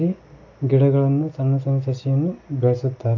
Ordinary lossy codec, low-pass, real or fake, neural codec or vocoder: AAC, 48 kbps; 7.2 kHz; real; none